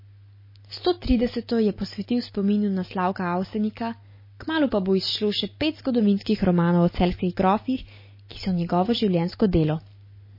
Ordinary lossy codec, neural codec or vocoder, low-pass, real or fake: MP3, 24 kbps; none; 5.4 kHz; real